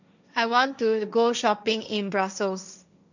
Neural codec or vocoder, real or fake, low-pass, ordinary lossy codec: codec, 16 kHz, 1.1 kbps, Voila-Tokenizer; fake; 7.2 kHz; none